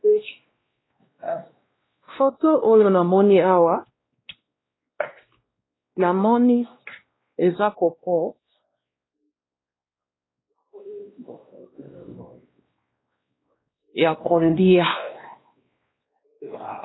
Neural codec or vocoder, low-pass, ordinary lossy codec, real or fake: codec, 16 kHz, 1 kbps, X-Codec, WavLM features, trained on Multilingual LibriSpeech; 7.2 kHz; AAC, 16 kbps; fake